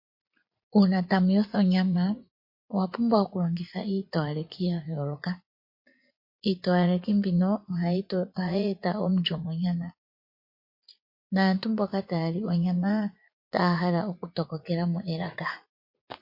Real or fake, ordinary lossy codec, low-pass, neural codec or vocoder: fake; MP3, 32 kbps; 5.4 kHz; vocoder, 44.1 kHz, 80 mel bands, Vocos